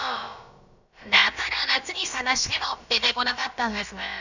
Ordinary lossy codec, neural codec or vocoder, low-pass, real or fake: none; codec, 16 kHz, about 1 kbps, DyCAST, with the encoder's durations; 7.2 kHz; fake